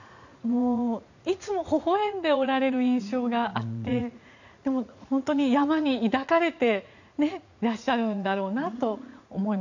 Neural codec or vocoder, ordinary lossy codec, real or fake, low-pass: vocoder, 44.1 kHz, 80 mel bands, Vocos; none; fake; 7.2 kHz